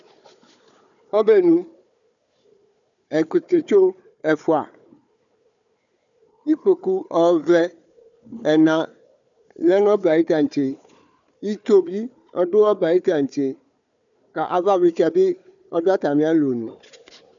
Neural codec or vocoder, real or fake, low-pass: codec, 16 kHz, 4 kbps, FunCodec, trained on Chinese and English, 50 frames a second; fake; 7.2 kHz